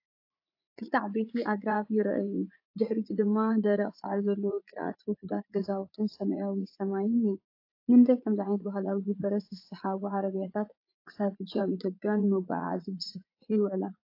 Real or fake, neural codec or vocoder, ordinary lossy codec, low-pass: fake; vocoder, 44.1 kHz, 80 mel bands, Vocos; AAC, 32 kbps; 5.4 kHz